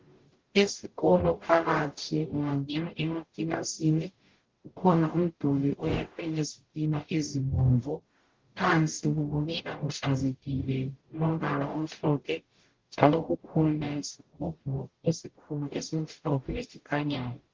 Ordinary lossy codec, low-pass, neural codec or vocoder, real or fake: Opus, 16 kbps; 7.2 kHz; codec, 44.1 kHz, 0.9 kbps, DAC; fake